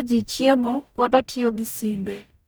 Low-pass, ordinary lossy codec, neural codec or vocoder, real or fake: none; none; codec, 44.1 kHz, 0.9 kbps, DAC; fake